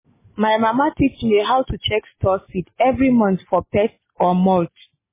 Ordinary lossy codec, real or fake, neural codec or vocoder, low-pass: MP3, 16 kbps; real; none; 3.6 kHz